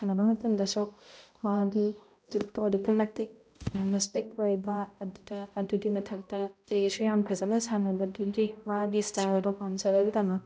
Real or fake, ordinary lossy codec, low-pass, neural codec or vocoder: fake; none; none; codec, 16 kHz, 0.5 kbps, X-Codec, HuBERT features, trained on balanced general audio